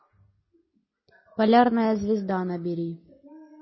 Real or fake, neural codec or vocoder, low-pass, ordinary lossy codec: real; none; 7.2 kHz; MP3, 24 kbps